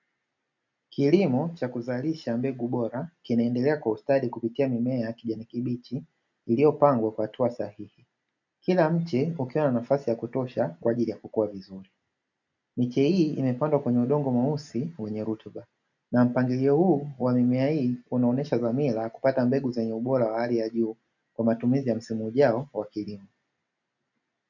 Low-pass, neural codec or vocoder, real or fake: 7.2 kHz; none; real